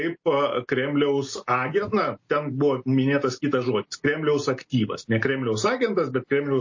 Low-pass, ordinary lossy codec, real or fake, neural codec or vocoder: 7.2 kHz; MP3, 32 kbps; real; none